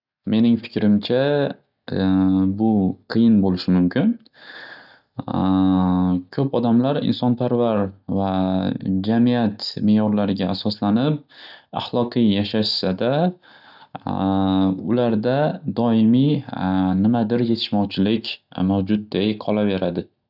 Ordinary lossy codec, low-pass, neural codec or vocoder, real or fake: none; 5.4 kHz; none; real